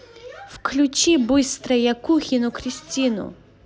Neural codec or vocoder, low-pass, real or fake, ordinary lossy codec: none; none; real; none